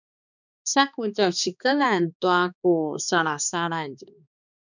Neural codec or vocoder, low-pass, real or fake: codec, 16 kHz, 2 kbps, X-Codec, HuBERT features, trained on balanced general audio; 7.2 kHz; fake